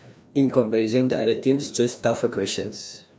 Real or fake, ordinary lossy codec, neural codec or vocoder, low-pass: fake; none; codec, 16 kHz, 1 kbps, FreqCodec, larger model; none